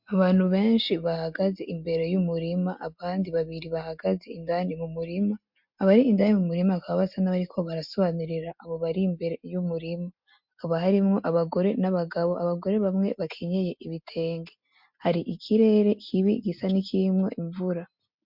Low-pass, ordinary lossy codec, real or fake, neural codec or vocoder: 5.4 kHz; MP3, 48 kbps; real; none